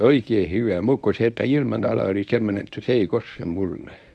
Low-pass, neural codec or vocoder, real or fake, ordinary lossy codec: none; codec, 24 kHz, 0.9 kbps, WavTokenizer, medium speech release version 1; fake; none